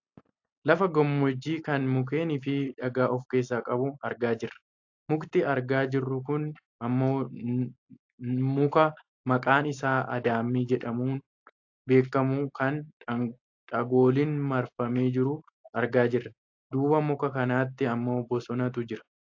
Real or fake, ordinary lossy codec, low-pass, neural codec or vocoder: real; AAC, 48 kbps; 7.2 kHz; none